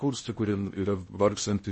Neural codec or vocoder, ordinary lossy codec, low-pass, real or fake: codec, 16 kHz in and 24 kHz out, 0.6 kbps, FocalCodec, streaming, 2048 codes; MP3, 32 kbps; 10.8 kHz; fake